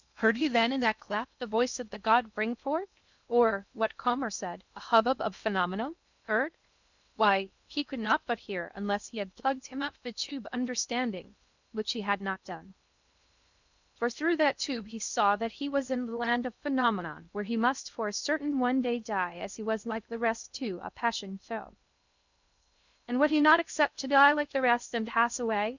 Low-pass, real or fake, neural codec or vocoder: 7.2 kHz; fake; codec, 16 kHz in and 24 kHz out, 0.6 kbps, FocalCodec, streaming, 2048 codes